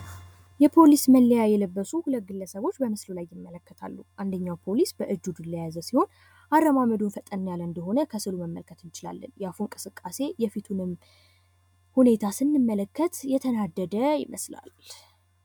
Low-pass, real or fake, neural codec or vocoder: 19.8 kHz; real; none